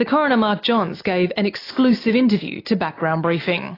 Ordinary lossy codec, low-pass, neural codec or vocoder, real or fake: AAC, 24 kbps; 5.4 kHz; none; real